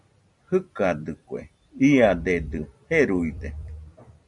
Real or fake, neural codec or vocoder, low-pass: fake; vocoder, 44.1 kHz, 128 mel bands every 256 samples, BigVGAN v2; 10.8 kHz